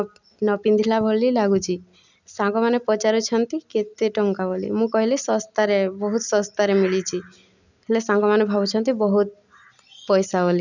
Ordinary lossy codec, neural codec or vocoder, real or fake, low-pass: none; none; real; 7.2 kHz